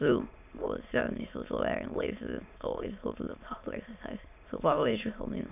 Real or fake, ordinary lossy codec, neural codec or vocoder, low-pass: fake; none; autoencoder, 22.05 kHz, a latent of 192 numbers a frame, VITS, trained on many speakers; 3.6 kHz